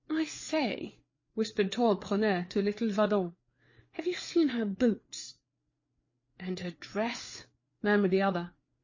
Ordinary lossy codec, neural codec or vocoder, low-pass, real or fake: MP3, 32 kbps; codec, 16 kHz, 4 kbps, FreqCodec, larger model; 7.2 kHz; fake